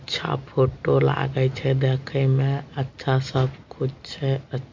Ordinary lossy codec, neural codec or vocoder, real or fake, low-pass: MP3, 48 kbps; none; real; 7.2 kHz